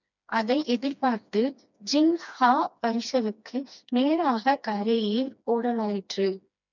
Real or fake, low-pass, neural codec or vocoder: fake; 7.2 kHz; codec, 16 kHz, 2 kbps, FreqCodec, smaller model